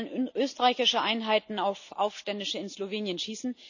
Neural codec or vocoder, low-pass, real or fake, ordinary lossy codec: none; 7.2 kHz; real; none